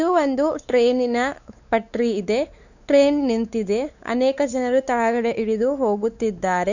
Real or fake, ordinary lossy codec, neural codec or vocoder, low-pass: fake; none; codec, 16 kHz, 4 kbps, X-Codec, WavLM features, trained on Multilingual LibriSpeech; 7.2 kHz